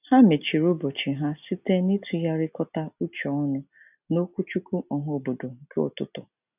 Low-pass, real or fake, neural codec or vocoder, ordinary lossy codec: 3.6 kHz; real; none; none